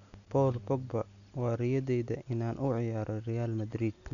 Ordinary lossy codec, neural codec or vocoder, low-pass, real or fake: none; none; 7.2 kHz; real